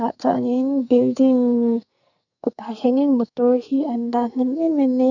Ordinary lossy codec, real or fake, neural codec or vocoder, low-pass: none; fake; codec, 44.1 kHz, 2.6 kbps, SNAC; 7.2 kHz